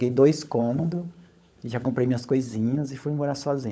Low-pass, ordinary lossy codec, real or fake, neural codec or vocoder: none; none; fake; codec, 16 kHz, 16 kbps, FunCodec, trained on LibriTTS, 50 frames a second